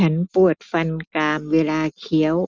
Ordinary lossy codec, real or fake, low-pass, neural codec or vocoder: none; real; none; none